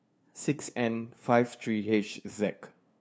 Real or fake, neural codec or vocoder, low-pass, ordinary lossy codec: fake; codec, 16 kHz, 2 kbps, FunCodec, trained on LibriTTS, 25 frames a second; none; none